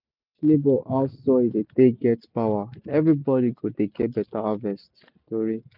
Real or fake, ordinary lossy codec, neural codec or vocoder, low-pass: real; none; none; 5.4 kHz